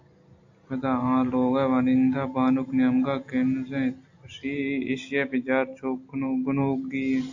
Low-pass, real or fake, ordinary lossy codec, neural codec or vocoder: 7.2 kHz; real; AAC, 48 kbps; none